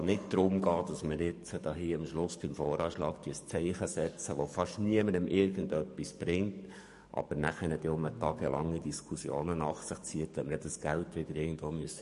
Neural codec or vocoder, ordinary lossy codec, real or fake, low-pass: codec, 44.1 kHz, 7.8 kbps, DAC; MP3, 48 kbps; fake; 14.4 kHz